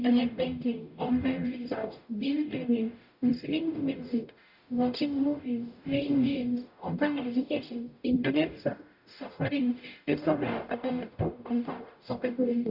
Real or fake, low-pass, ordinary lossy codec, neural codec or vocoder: fake; 5.4 kHz; none; codec, 44.1 kHz, 0.9 kbps, DAC